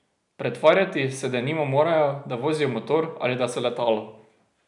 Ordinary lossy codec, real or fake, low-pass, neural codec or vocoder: none; real; 10.8 kHz; none